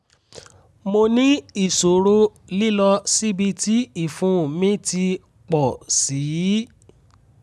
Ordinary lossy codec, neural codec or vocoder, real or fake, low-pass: none; none; real; none